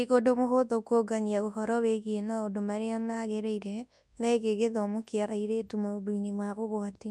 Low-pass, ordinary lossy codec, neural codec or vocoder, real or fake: none; none; codec, 24 kHz, 0.9 kbps, WavTokenizer, large speech release; fake